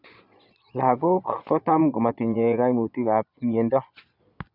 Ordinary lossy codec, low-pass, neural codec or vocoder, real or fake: none; 5.4 kHz; vocoder, 22.05 kHz, 80 mel bands, Vocos; fake